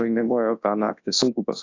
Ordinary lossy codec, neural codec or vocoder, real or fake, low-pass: AAC, 48 kbps; codec, 24 kHz, 0.9 kbps, WavTokenizer, large speech release; fake; 7.2 kHz